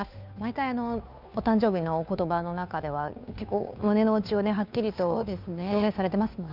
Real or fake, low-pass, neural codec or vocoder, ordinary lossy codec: fake; 5.4 kHz; codec, 16 kHz, 2 kbps, FunCodec, trained on Chinese and English, 25 frames a second; none